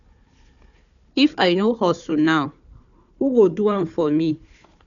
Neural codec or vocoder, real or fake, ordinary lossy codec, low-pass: codec, 16 kHz, 4 kbps, FunCodec, trained on Chinese and English, 50 frames a second; fake; none; 7.2 kHz